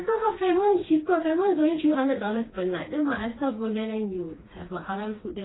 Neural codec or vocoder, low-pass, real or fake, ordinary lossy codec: codec, 16 kHz, 2 kbps, FreqCodec, smaller model; 7.2 kHz; fake; AAC, 16 kbps